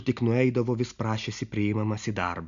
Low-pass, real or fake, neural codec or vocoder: 7.2 kHz; real; none